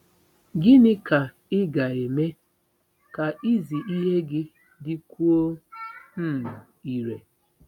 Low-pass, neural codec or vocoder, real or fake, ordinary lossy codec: 19.8 kHz; none; real; none